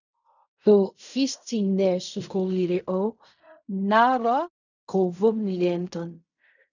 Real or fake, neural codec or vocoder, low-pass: fake; codec, 16 kHz in and 24 kHz out, 0.4 kbps, LongCat-Audio-Codec, fine tuned four codebook decoder; 7.2 kHz